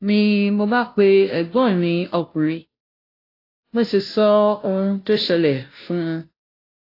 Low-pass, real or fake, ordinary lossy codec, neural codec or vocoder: 5.4 kHz; fake; AAC, 32 kbps; codec, 16 kHz, 0.5 kbps, FunCodec, trained on Chinese and English, 25 frames a second